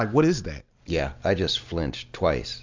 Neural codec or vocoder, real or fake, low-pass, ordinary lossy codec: none; real; 7.2 kHz; MP3, 64 kbps